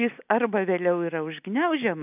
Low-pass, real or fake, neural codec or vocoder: 3.6 kHz; real; none